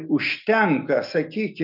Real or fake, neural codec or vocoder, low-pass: real; none; 5.4 kHz